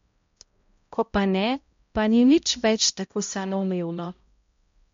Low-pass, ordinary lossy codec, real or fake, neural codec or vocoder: 7.2 kHz; MP3, 48 kbps; fake; codec, 16 kHz, 0.5 kbps, X-Codec, HuBERT features, trained on balanced general audio